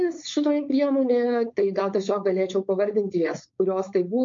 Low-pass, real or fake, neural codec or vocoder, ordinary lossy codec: 7.2 kHz; fake; codec, 16 kHz, 4.8 kbps, FACodec; MP3, 48 kbps